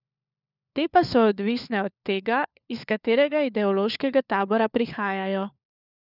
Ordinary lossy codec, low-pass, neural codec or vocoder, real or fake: none; 5.4 kHz; codec, 16 kHz, 4 kbps, FunCodec, trained on LibriTTS, 50 frames a second; fake